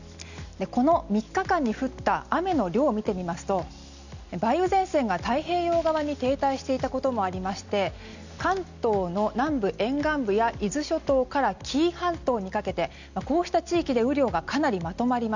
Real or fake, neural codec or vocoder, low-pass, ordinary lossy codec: real; none; 7.2 kHz; none